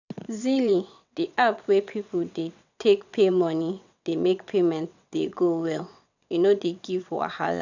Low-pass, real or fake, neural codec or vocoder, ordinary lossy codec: 7.2 kHz; fake; vocoder, 22.05 kHz, 80 mel bands, WaveNeXt; none